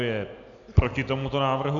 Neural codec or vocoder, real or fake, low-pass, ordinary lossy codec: none; real; 7.2 kHz; MP3, 96 kbps